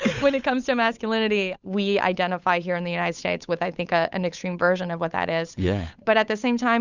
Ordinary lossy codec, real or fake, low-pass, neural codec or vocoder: Opus, 64 kbps; fake; 7.2 kHz; codec, 16 kHz, 8 kbps, FunCodec, trained on Chinese and English, 25 frames a second